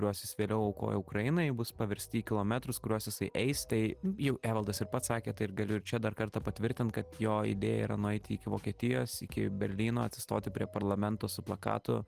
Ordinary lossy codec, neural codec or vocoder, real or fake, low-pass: Opus, 24 kbps; none; real; 14.4 kHz